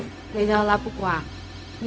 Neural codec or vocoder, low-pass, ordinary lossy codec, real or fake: codec, 16 kHz, 0.4 kbps, LongCat-Audio-Codec; none; none; fake